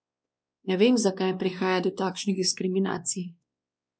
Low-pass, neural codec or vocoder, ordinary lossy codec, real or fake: none; codec, 16 kHz, 2 kbps, X-Codec, WavLM features, trained on Multilingual LibriSpeech; none; fake